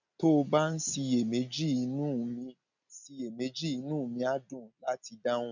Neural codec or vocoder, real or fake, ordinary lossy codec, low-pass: none; real; none; 7.2 kHz